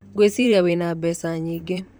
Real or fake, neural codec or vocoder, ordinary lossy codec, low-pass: fake; vocoder, 44.1 kHz, 128 mel bands, Pupu-Vocoder; none; none